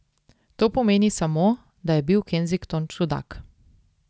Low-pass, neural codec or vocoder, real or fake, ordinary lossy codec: none; none; real; none